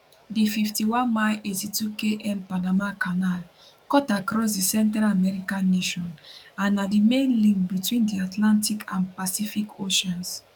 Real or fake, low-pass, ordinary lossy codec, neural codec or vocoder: fake; 19.8 kHz; none; codec, 44.1 kHz, 7.8 kbps, DAC